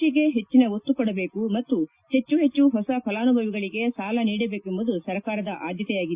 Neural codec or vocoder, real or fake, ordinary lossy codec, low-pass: none; real; Opus, 64 kbps; 3.6 kHz